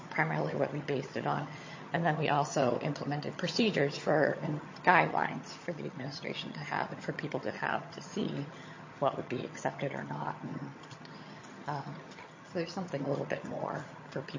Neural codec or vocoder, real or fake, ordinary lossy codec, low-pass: vocoder, 22.05 kHz, 80 mel bands, HiFi-GAN; fake; MP3, 32 kbps; 7.2 kHz